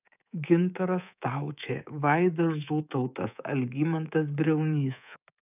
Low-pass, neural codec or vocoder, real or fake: 3.6 kHz; codec, 24 kHz, 3.1 kbps, DualCodec; fake